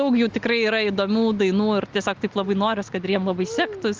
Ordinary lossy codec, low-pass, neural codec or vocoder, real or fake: Opus, 32 kbps; 7.2 kHz; none; real